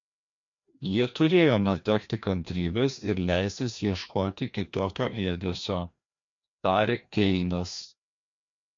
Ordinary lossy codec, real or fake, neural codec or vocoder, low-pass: MP3, 48 kbps; fake; codec, 16 kHz, 1 kbps, FreqCodec, larger model; 7.2 kHz